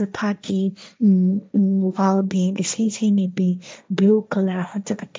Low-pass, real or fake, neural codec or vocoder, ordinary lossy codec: none; fake; codec, 16 kHz, 1.1 kbps, Voila-Tokenizer; none